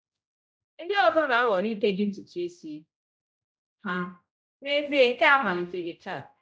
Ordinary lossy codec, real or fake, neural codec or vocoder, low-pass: none; fake; codec, 16 kHz, 0.5 kbps, X-Codec, HuBERT features, trained on general audio; none